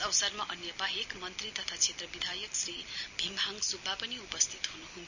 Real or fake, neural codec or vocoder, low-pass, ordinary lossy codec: real; none; 7.2 kHz; none